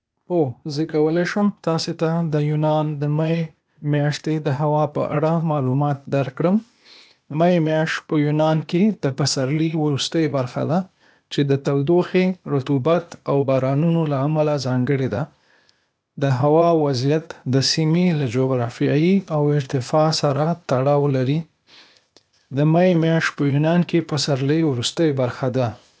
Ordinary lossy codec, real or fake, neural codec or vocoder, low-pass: none; fake; codec, 16 kHz, 0.8 kbps, ZipCodec; none